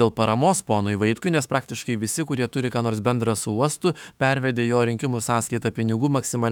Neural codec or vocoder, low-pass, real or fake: autoencoder, 48 kHz, 32 numbers a frame, DAC-VAE, trained on Japanese speech; 19.8 kHz; fake